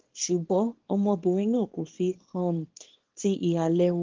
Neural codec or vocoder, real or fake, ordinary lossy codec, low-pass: codec, 24 kHz, 0.9 kbps, WavTokenizer, small release; fake; Opus, 16 kbps; 7.2 kHz